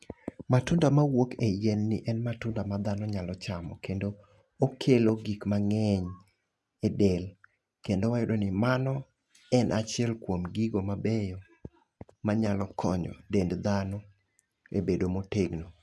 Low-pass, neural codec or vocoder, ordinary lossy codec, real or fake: none; none; none; real